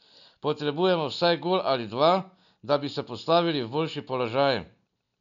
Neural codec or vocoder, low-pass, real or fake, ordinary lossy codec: none; 7.2 kHz; real; none